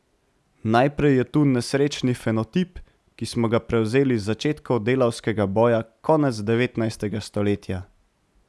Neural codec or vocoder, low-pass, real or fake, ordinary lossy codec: none; none; real; none